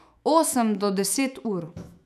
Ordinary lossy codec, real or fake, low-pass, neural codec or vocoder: none; fake; 14.4 kHz; autoencoder, 48 kHz, 128 numbers a frame, DAC-VAE, trained on Japanese speech